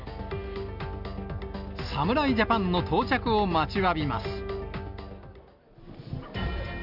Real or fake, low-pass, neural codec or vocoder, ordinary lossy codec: real; 5.4 kHz; none; none